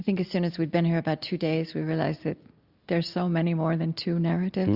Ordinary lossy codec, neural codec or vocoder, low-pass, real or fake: Opus, 64 kbps; none; 5.4 kHz; real